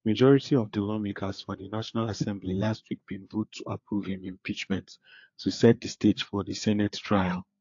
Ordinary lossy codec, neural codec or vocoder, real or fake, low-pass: AAC, 48 kbps; codec, 16 kHz, 4 kbps, FreqCodec, larger model; fake; 7.2 kHz